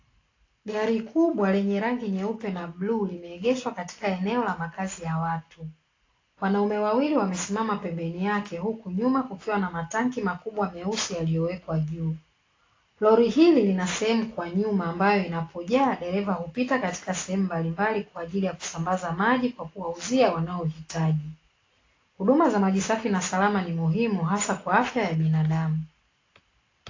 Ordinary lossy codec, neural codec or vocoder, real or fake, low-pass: AAC, 32 kbps; none; real; 7.2 kHz